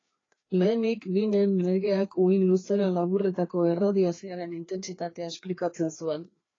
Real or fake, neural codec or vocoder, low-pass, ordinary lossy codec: fake; codec, 16 kHz, 2 kbps, FreqCodec, larger model; 7.2 kHz; AAC, 32 kbps